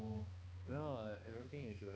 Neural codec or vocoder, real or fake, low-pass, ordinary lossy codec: codec, 16 kHz, 2 kbps, X-Codec, HuBERT features, trained on balanced general audio; fake; none; none